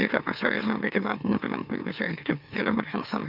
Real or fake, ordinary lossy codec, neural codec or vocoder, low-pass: fake; none; autoencoder, 44.1 kHz, a latent of 192 numbers a frame, MeloTTS; 5.4 kHz